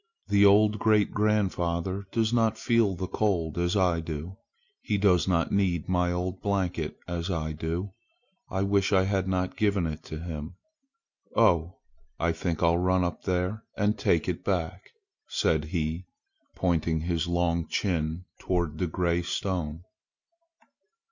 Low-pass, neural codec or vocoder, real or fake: 7.2 kHz; none; real